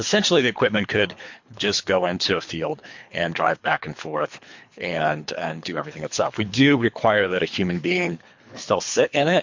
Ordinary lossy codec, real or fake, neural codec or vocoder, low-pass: MP3, 48 kbps; fake; codec, 24 kHz, 3 kbps, HILCodec; 7.2 kHz